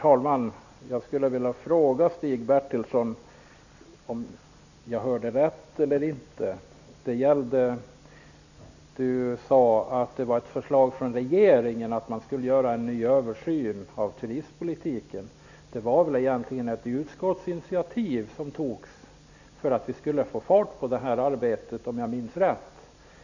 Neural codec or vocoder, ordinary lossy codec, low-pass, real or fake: none; none; 7.2 kHz; real